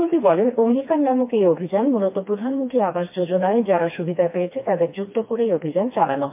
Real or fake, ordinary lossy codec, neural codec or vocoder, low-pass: fake; MP3, 32 kbps; codec, 16 kHz, 2 kbps, FreqCodec, smaller model; 3.6 kHz